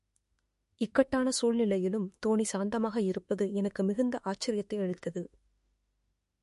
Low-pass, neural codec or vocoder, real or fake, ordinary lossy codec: 14.4 kHz; autoencoder, 48 kHz, 32 numbers a frame, DAC-VAE, trained on Japanese speech; fake; MP3, 48 kbps